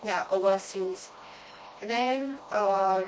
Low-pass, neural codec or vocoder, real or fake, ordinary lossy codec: none; codec, 16 kHz, 1 kbps, FreqCodec, smaller model; fake; none